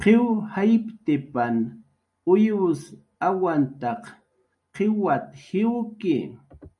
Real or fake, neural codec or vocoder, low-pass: real; none; 10.8 kHz